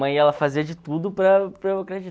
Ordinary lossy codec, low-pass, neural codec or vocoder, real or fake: none; none; none; real